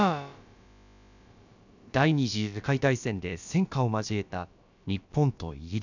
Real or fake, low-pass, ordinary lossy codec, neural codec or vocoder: fake; 7.2 kHz; none; codec, 16 kHz, about 1 kbps, DyCAST, with the encoder's durations